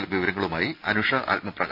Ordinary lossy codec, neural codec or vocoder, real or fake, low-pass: none; none; real; 5.4 kHz